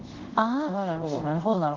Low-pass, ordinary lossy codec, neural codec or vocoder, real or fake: 7.2 kHz; Opus, 16 kbps; codec, 24 kHz, 0.5 kbps, DualCodec; fake